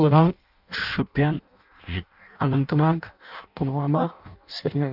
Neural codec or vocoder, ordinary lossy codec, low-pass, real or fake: codec, 16 kHz in and 24 kHz out, 0.6 kbps, FireRedTTS-2 codec; none; 5.4 kHz; fake